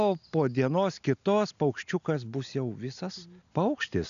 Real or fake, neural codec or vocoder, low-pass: real; none; 7.2 kHz